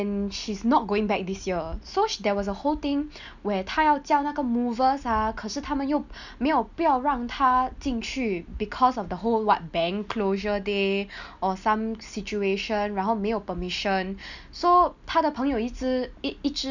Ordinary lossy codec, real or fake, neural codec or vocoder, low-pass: none; real; none; 7.2 kHz